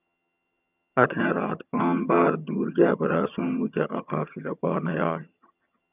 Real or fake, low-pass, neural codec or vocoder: fake; 3.6 kHz; vocoder, 22.05 kHz, 80 mel bands, HiFi-GAN